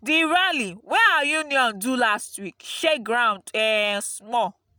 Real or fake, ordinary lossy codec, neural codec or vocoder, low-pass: real; none; none; none